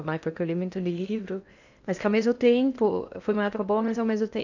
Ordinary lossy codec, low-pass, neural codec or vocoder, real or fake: none; 7.2 kHz; codec, 16 kHz in and 24 kHz out, 0.8 kbps, FocalCodec, streaming, 65536 codes; fake